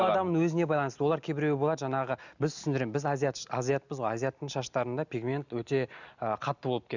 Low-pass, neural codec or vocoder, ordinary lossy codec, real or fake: 7.2 kHz; none; none; real